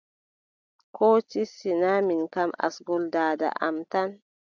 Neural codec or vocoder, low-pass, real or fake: none; 7.2 kHz; real